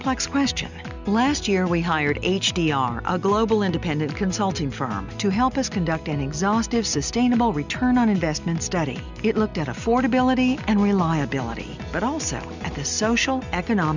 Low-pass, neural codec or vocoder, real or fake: 7.2 kHz; none; real